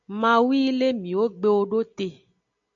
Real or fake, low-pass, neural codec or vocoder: real; 7.2 kHz; none